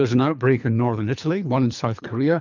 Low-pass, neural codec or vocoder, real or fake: 7.2 kHz; codec, 24 kHz, 3 kbps, HILCodec; fake